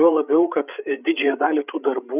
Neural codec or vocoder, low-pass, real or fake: codec, 16 kHz, 8 kbps, FreqCodec, larger model; 3.6 kHz; fake